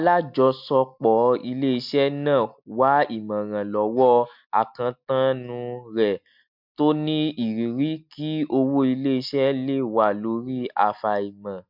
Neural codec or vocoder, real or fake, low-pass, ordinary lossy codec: none; real; 5.4 kHz; MP3, 48 kbps